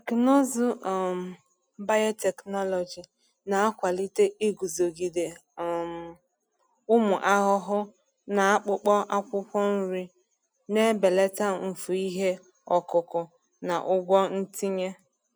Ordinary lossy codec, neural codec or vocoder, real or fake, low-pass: none; none; real; none